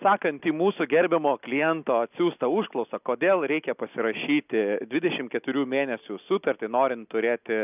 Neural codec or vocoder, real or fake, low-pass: none; real; 3.6 kHz